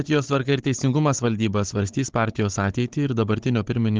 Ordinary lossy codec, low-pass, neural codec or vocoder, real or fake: Opus, 16 kbps; 7.2 kHz; codec, 16 kHz, 16 kbps, FunCodec, trained on Chinese and English, 50 frames a second; fake